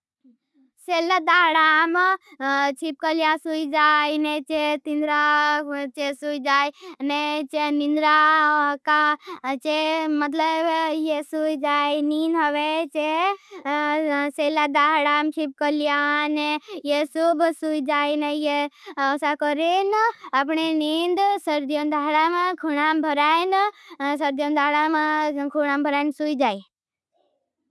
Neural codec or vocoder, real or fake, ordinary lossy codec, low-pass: none; real; none; none